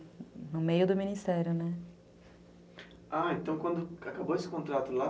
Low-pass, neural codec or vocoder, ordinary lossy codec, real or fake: none; none; none; real